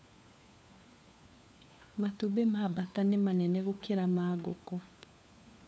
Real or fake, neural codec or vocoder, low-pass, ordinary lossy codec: fake; codec, 16 kHz, 4 kbps, FunCodec, trained on LibriTTS, 50 frames a second; none; none